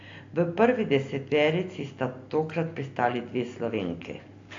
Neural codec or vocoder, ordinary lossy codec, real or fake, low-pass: none; AAC, 48 kbps; real; 7.2 kHz